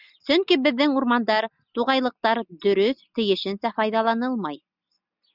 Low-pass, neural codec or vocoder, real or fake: 5.4 kHz; none; real